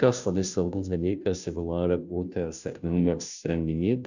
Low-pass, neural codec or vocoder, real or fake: 7.2 kHz; codec, 16 kHz, 0.5 kbps, FunCodec, trained on Chinese and English, 25 frames a second; fake